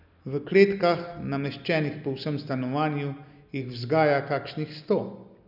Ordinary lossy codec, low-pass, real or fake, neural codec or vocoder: none; 5.4 kHz; real; none